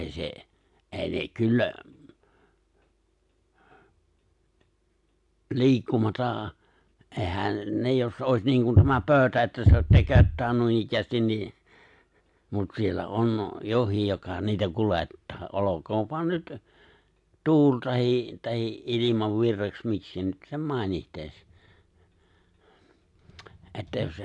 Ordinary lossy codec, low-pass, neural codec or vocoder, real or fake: AAC, 64 kbps; 10.8 kHz; none; real